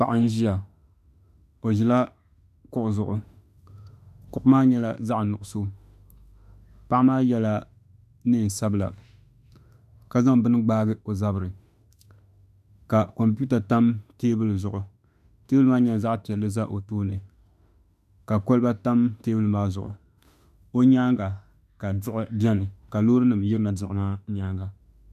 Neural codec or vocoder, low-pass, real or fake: autoencoder, 48 kHz, 32 numbers a frame, DAC-VAE, trained on Japanese speech; 14.4 kHz; fake